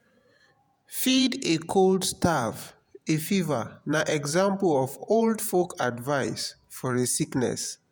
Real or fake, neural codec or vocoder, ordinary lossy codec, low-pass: fake; vocoder, 48 kHz, 128 mel bands, Vocos; none; none